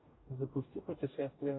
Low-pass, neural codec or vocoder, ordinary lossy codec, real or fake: 7.2 kHz; codec, 44.1 kHz, 2.6 kbps, DAC; AAC, 16 kbps; fake